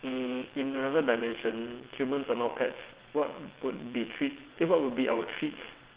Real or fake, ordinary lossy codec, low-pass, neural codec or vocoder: fake; Opus, 16 kbps; 3.6 kHz; vocoder, 22.05 kHz, 80 mel bands, WaveNeXt